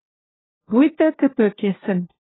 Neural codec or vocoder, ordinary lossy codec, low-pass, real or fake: codec, 16 kHz, 1 kbps, FunCodec, trained on LibriTTS, 50 frames a second; AAC, 16 kbps; 7.2 kHz; fake